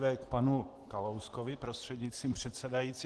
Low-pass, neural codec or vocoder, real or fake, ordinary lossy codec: 10.8 kHz; none; real; Opus, 16 kbps